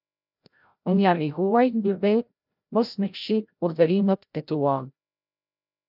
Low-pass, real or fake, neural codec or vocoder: 5.4 kHz; fake; codec, 16 kHz, 0.5 kbps, FreqCodec, larger model